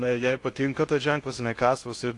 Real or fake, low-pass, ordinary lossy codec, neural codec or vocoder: fake; 10.8 kHz; AAC, 48 kbps; codec, 16 kHz in and 24 kHz out, 0.6 kbps, FocalCodec, streaming, 2048 codes